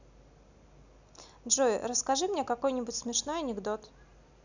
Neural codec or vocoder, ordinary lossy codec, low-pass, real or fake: none; none; 7.2 kHz; real